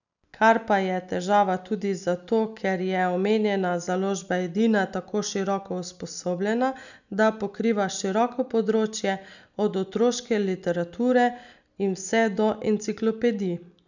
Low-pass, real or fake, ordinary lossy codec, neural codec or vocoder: 7.2 kHz; real; none; none